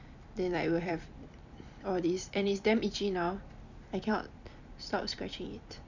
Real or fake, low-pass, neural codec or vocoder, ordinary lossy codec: fake; 7.2 kHz; vocoder, 44.1 kHz, 128 mel bands every 256 samples, BigVGAN v2; none